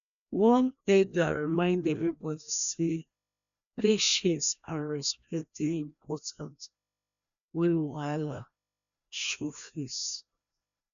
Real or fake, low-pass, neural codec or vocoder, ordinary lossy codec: fake; 7.2 kHz; codec, 16 kHz, 1 kbps, FreqCodec, larger model; none